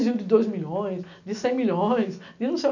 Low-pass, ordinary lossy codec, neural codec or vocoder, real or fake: 7.2 kHz; none; none; real